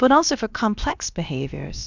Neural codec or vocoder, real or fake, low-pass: codec, 16 kHz, about 1 kbps, DyCAST, with the encoder's durations; fake; 7.2 kHz